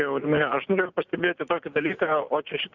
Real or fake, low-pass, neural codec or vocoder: real; 7.2 kHz; none